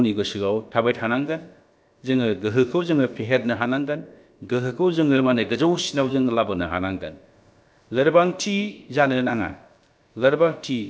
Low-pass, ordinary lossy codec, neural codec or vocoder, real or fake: none; none; codec, 16 kHz, about 1 kbps, DyCAST, with the encoder's durations; fake